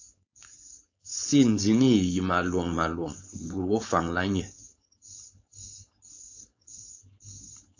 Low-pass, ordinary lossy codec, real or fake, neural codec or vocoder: 7.2 kHz; AAC, 48 kbps; fake; codec, 16 kHz, 4.8 kbps, FACodec